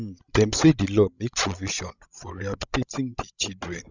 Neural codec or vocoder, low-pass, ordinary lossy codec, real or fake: codec, 16 kHz, 16 kbps, FreqCodec, larger model; 7.2 kHz; none; fake